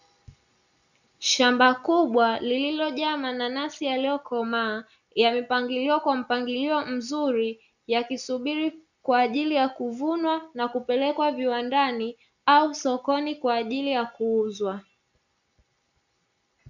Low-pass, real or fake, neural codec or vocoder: 7.2 kHz; real; none